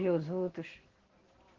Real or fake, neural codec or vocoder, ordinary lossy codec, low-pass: real; none; Opus, 16 kbps; 7.2 kHz